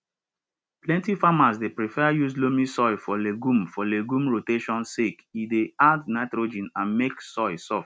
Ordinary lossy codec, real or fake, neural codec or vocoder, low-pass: none; real; none; none